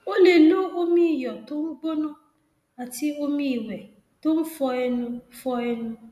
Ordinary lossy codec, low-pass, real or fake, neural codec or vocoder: MP3, 96 kbps; 14.4 kHz; real; none